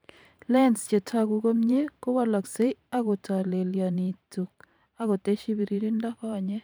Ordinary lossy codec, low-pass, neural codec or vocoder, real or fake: none; none; vocoder, 44.1 kHz, 128 mel bands every 512 samples, BigVGAN v2; fake